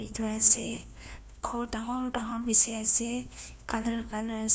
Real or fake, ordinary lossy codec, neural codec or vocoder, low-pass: fake; none; codec, 16 kHz, 1 kbps, FunCodec, trained on LibriTTS, 50 frames a second; none